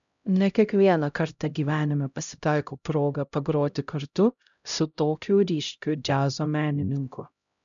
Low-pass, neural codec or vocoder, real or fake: 7.2 kHz; codec, 16 kHz, 0.5 kbps, X-Codec, HuBERT features, trained on LibriSpeech; fake